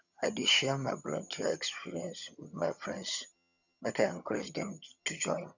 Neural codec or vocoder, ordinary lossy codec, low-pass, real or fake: vocoder, 22.05 kHz, 80 mel bands, HiFi-GAN; none; 7.2 kHz; fake